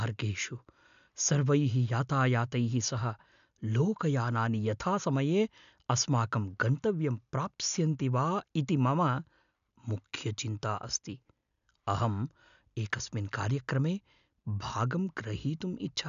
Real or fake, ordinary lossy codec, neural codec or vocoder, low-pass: real; AAC, 96 kbps; none; 7.2 kHz